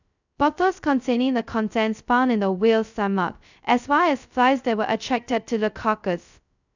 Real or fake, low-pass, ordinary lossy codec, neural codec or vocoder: fake; 7.2 kHz; none; codec, 16 kHz, 0.2 kbps, FocalCodec